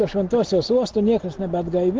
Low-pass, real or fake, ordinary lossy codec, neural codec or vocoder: 7.2 kHz; real; Opus, 16 kbps; none